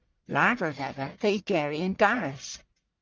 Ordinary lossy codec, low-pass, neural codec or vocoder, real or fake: Opus, 32 kbps; 7.2 kHz; codec, 44.1 kHz, 1.7 kbps, Pupu-Codec; fake